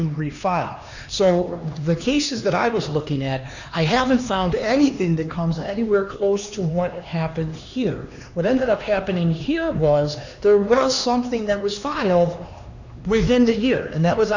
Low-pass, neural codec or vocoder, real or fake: 7.2 kHz; codec, 16 kHz, 2 kbps, X-Codec, HuBERT features, trained on LibriSpeech; fake